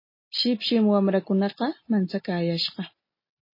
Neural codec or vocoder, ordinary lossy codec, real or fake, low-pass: none; MP3, 24 kbps; real; 5.4 kHz